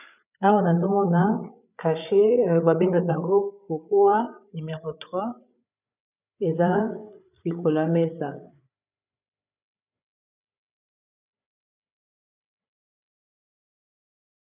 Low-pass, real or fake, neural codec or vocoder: 3.6 kHz; fake; codec, 16 kHz, 8 kbps, FreqCodec, larger model